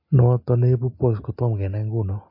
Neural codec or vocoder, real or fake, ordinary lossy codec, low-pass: none; real; MP3, 32 kbps; 5.4 kHz